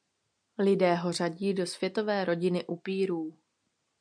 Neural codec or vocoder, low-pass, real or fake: none; 9.9 kHz; real